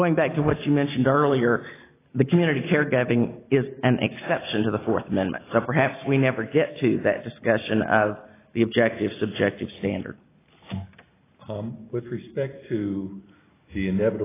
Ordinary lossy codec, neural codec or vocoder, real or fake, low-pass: AAC, 16 kbps; none; real; 3.6 kHz